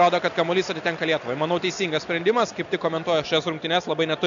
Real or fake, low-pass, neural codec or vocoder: real; 7.2 kHz; none